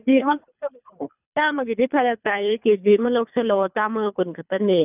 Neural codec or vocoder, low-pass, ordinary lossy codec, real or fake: codec, 24 kHz, 3 kbps, HILCodec; 3.6 kHz; none; fake